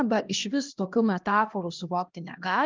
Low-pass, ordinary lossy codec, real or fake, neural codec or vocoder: 7.2 kHz; Opus, 24 kbps; fake; codec, 16 kHz, 1 kbps, X-Codec, HuBERT features, trained on LibriSpeech